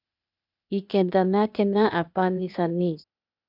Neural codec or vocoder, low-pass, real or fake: codec, 16 kHz, 0.8 kbps, ZipCodec; 5.4 kHz; fake